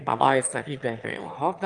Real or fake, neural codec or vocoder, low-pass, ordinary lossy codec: fake; autoencoder, 22.05 kHz, a latent of 192 numbers a frame, VITS, trained on one speaker; 9.9 kHz; Opus, 32 kbps